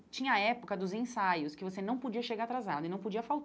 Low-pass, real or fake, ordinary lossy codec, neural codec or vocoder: none; real; none; none